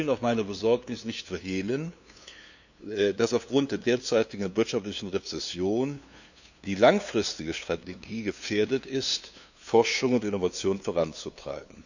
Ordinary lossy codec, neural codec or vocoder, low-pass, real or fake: none; codec, 16 kHz, 2 kbps, FunCodec, trained on LibriTTS, 25 frames a second; 7.2 kHz; fake